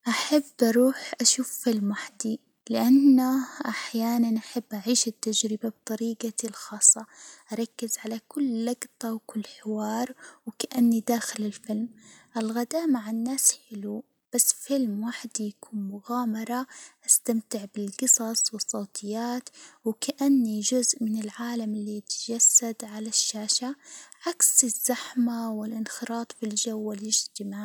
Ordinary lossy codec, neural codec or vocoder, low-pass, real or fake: none; none; none; real